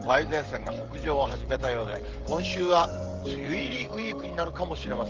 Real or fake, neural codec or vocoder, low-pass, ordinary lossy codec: fake; codec, 16 kHz, 16 kbps, FreqCodec, smaller model; 7.2 kHz; Opus, 16 kbps